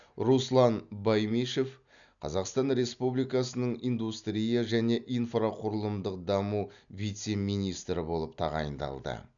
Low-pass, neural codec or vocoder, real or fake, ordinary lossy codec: 7.2 kHz; none; real; none